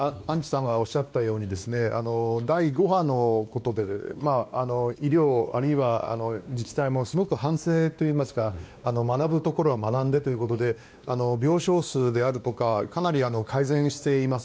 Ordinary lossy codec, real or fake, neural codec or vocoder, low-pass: none; fake; codec, 16 kHz, 2 kbps, X-Codec, WavLM features, trained on Multilingual LibriSpeech; none